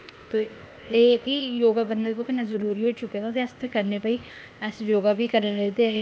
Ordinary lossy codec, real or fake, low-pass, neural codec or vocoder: none; fake; none; codec, 16 kHz, 0.8 kbps, ZipCodec